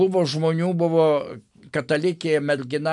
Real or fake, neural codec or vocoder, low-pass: real; none; 10.8 kHz